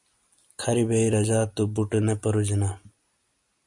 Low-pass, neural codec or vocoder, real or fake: 10.8 kHz; none; real